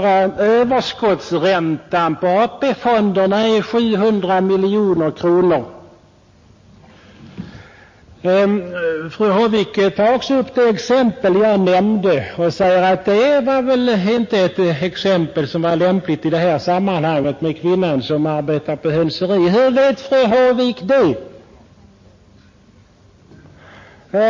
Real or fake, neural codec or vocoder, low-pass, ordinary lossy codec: real; none; 7.2 kHz; MP3, 32 kbps